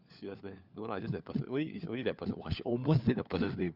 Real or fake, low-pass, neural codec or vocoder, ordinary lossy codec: fake; 5.4 kHz; codec, 16 kHz, 4 kbps, FunCodec, trained on LibriTTS, 50 frames a second; Opus, 64 kbps